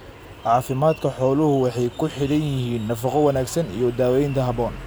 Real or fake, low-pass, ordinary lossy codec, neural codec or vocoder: real; none; none; none